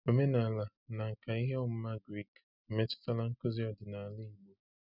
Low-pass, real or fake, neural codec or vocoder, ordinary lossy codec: 5.4 kHz; real; none; none